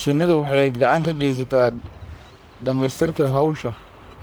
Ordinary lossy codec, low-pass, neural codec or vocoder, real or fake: none; none; codec, 44.1 kHz, 1.7 kbps, Pupu-Codec; fake